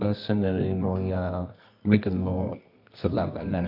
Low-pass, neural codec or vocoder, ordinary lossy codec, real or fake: 5.4 kHz; codec, 24 kHz, 0.9 kbps, WavTokenizer, medium music audio release; none; fake